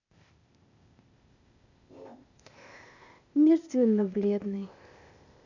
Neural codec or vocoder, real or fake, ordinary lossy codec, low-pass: codec, 16 kHz, 0.8 kbps, ZipCodec; fake; none; 7.2 kHz